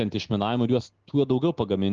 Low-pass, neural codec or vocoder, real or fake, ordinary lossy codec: 7.2 kHz; none; real; Opus, 16 kbps